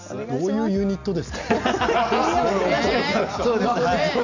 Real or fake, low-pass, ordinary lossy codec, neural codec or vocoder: real; 7.2 kHz; none; none